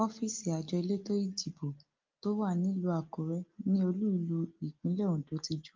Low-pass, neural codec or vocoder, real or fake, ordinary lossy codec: 7.2 kHz; none; real; Opus, 24 kbps